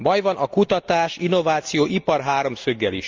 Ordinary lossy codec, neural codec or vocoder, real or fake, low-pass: Opus, 32 kbps; none; real; 7.2 kHz